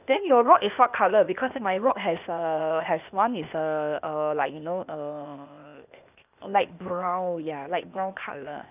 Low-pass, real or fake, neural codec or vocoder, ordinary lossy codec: 3.6 kHz; fake; codec, 16 kHz, 0.8 kbps, ZipCodec; none